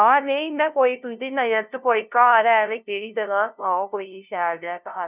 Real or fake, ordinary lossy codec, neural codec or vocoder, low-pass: fake; none; codec, 16 kHz, 0.5 kbps, FunCodec, trained on LibriTTS, 25 frames a second; 3.6 kHz